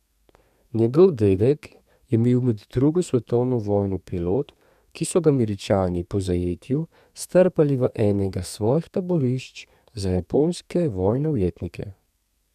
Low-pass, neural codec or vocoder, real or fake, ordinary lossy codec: 14.4 kHz; codec, 32 kHz, 1.9 kbps, SNAC; fake; none